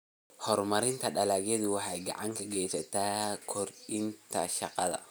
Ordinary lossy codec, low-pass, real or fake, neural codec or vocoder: none; none; real; none